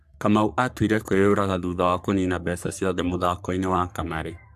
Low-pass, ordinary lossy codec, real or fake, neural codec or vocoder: 14.4 kHz; AAC, 96 kbps; fake; codec, 44.1 kHz, 3.4 kbps, Pupu-Codec